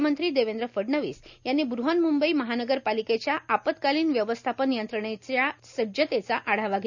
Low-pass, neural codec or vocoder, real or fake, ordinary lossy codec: 7.2 kHz; none; real; none